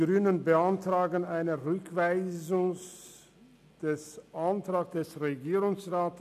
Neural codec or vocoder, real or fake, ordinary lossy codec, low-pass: none; real; none; 14.4 kHz